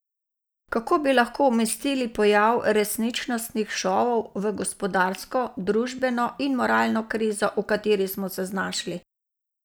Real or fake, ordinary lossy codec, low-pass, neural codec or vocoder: real; none; none; none